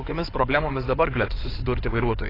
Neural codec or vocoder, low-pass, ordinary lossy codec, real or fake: codec, 16 kHz in and 24 kHz out, 2.2 kbps, FireRedTTS-2 codec; 5.4 kHz; AAC, 24 kbps; fake